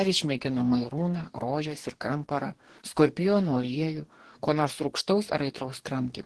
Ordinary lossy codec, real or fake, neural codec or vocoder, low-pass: Opus, 16 kbps; fake; codec, 44.1 kHz, 2.6 kbps, DAC; 10.8 kHz